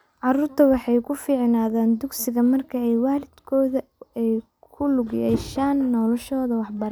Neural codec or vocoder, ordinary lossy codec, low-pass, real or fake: none; none; none; real